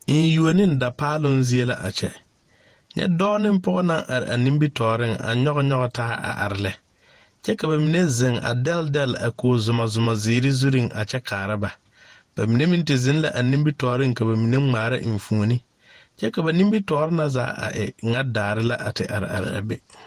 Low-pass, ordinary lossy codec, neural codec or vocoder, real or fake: 14.4 kHz; Opus, 24 kbps; vocoder, 48 kHz, 128 mel bands, Vocos; fake